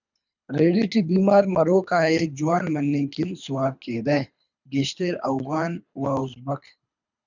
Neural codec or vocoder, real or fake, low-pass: codec, 24 kHz, 3 kbps, HILCodec; fake; 7.2 kHz